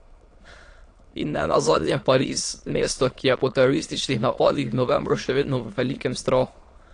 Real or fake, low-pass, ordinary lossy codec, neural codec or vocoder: fake; 9.9 kHz; AAC, 48 kbps; autoencoder, 22.05 kHz, a latent of 192 numbers a frame, VITS, trained on many speakers